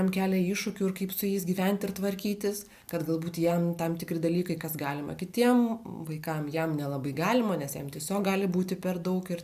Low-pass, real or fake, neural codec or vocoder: 14.4 kHz; real; none